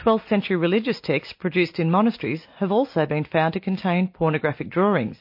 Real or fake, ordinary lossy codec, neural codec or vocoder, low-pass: real; MP3, 32 kbps; none; 5.4 kHz